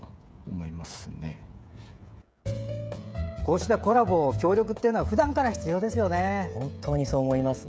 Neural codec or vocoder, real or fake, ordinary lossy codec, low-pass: codec, 16 kHz, 16 kbps, FreqCodec, smaller model; fake; none; none